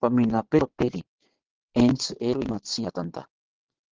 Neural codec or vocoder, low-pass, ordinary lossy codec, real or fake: vocoder, 22.05 kHz, 80 mel bands, WaveNeXt; 7.2 kHz; Opus, 16 kbps; fake